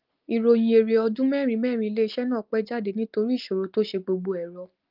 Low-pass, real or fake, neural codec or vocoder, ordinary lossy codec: 5.4 kHz; real; none; Opus, 24 kbps